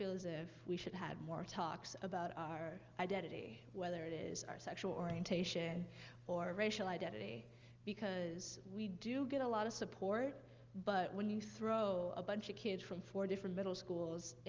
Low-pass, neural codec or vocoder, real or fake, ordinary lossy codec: 7.2 kHz; none; real; Opus, 32 kbps